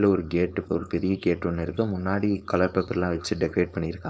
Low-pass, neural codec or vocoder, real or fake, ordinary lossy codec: none; codec, 16 kHz, 4.8 kbps, FACodec; fake; none